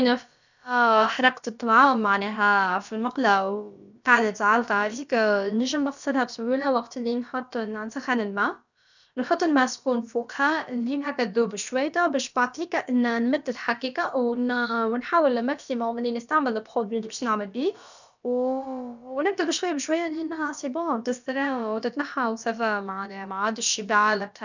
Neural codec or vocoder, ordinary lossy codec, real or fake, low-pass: codec, 16 kHz, about 1 kbps, DyCAST, with the encoder's durations; none; fake; 7.2 kHz